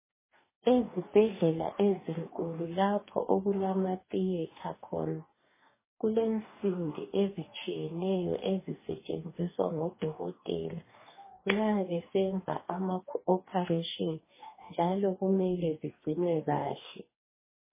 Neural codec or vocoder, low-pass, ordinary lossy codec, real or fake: codec, 44.1 kHz, 2.6 kbps, DAC; 3.6 kHz; MP3, 16 kbps; fake